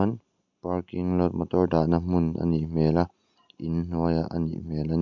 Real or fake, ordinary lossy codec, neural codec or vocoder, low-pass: real; none; none; 7.2 kHz